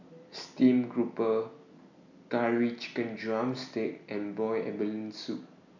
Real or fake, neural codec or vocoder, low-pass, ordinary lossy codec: real; none; 7.2 kHz; none